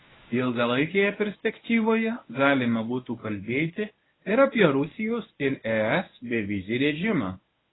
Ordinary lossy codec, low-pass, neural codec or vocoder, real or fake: AAC, 16 kbps; 7.2 kHz; codec, 24 kHz, 0.9 kbps, WavTokenizer, medium speech release version 1; fake